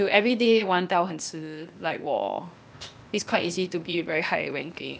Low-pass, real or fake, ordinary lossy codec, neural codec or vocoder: none; fake; none; codec, 16 kHz, 0.8 kbps, ZipCodec